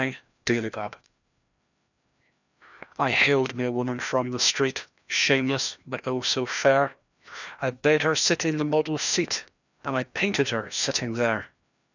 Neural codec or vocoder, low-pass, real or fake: codec, 16 kHz, 1 kbps, FreqCodec, larger model; 7.2 kHz; fake